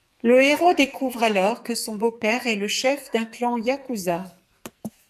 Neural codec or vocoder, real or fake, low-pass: codec, 44.1 kHz, 2.6 kbps, SNAC; fake; 14.4 kHz